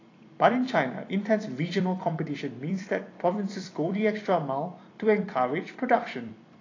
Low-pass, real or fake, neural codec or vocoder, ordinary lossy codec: 7.2 kHz; fake; vocoder, 44.1 kHz, 128 mel bands every 256 samples, BigVGAN v2; AAC, 32 kbps